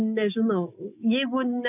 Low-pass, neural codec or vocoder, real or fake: 3.6 kHz; none; real